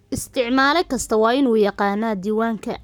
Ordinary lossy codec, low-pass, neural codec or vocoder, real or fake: none; none; codec, 44.1 kHz, 7.8 kbps, Pupu-Codec; fake